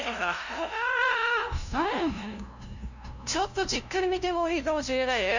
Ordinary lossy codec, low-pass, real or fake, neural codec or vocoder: none; 7.2 kHz; fake; codec, 16 kHz, 0.5 kbps, FunCodec, trained on LibriTTS, 25 frames a second